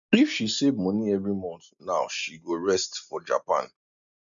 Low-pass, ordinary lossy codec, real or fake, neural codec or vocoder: 7.2 kHz; none; real; none